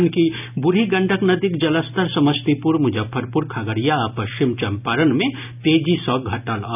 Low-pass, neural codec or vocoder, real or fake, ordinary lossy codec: 3.6 kHz; none; real; none